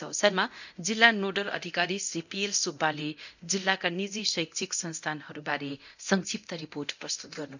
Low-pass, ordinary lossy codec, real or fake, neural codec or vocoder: 7.2 kHz; none; fake; codec, 24 kHz, 0.9 kbps, DualCodec